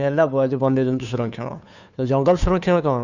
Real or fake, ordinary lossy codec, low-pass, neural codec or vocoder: fake; none; 7.2 kHz; codec, 16 kHz, 2 kbps, FunCodec, trained on Chinese and English, 25 frames a second